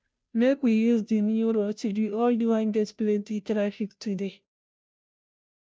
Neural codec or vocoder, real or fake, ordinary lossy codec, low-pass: codec, 16 kHz, 0.5 kbps, FunCodec, trained on Chinese and English, 25 frames a second; fake; none; none